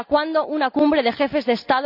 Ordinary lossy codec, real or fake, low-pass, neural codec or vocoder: none; real; 5.4 kHz; none